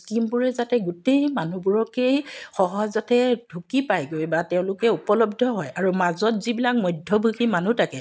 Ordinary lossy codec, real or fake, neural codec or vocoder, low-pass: none; real; none; none